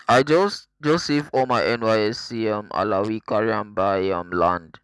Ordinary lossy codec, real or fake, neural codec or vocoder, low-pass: none; real; none; none